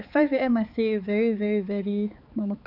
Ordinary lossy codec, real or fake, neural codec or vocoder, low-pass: none; fake; codec, 16 kHz, 4 kbps, X-Codec, HuBERT features, trained on balanced general audio; 5.4 kHz